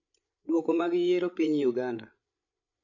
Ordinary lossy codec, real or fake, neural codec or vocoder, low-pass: none; fake; codec, 16 kHz, 8 kbps, FreqCodec, larger model; 7.2 kHz